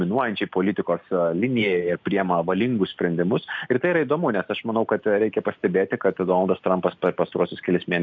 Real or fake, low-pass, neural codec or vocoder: real; 7.2 kHz; none